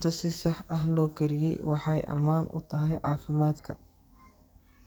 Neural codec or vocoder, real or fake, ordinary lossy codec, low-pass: codec, 44.1 kHz, 2.6 kbps, SNAC; fake; none; none